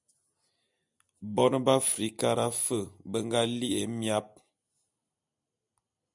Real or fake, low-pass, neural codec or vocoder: real; 10.8 kHz; none